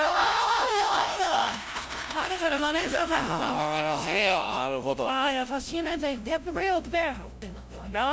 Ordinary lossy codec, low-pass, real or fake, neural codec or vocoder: none; none; fake; codec, 16 kHz, 0.5 kbps, FunCodec, trained on LibriTTS, 25 frames a second